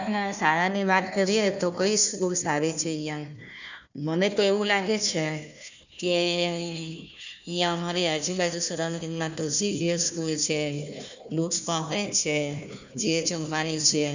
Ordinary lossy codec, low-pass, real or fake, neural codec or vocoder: none; 7.2 kHz; fake; codec, 16 kHz, 1 kbps, FunCodec, trained on Chinese and English, 50 frames a second